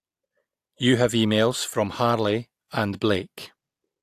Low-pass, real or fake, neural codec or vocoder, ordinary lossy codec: 14.4 kHz; real; none; AAC, 64 kbps